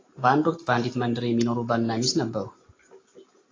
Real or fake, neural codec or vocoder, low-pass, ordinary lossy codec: real; none; 7.2 kHz; AAC, 32 kbps